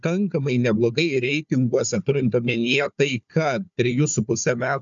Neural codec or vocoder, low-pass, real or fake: codec, 16 kHz, 4 kbps, FunCodec, trained on Chinese and English, 50 frames a second; 7.2 kHz; fake